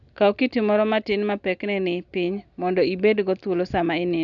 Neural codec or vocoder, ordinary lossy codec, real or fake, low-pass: none; none; real; 7.2 kHz